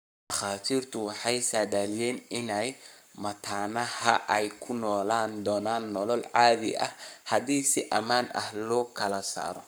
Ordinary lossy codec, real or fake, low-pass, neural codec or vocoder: none; fake; none; codec, 44.1 kHz, 7.8 kbps, Pupu-Codec